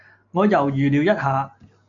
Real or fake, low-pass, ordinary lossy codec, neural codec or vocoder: real; 7.2 kHz; MP3, 64 kbps; none